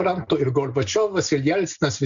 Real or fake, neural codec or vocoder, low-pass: real; none; 7.2 kHz